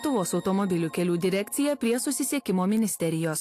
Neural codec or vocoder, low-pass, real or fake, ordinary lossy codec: none; 14.4 kHz; real; AAC, 64 kbps